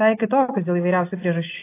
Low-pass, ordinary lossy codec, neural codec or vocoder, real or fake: 3.6 kHz; AAC, 24 kbps; none; real